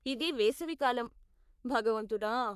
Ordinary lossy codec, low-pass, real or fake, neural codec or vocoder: none; 14.4 kHz; fake; codec, 44.1 kHz, 3.4 kbps, Pupu-Codec